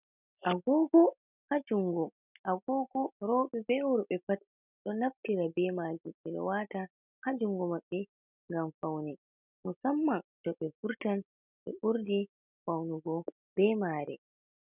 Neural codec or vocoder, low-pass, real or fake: none; 3.6 kHz; real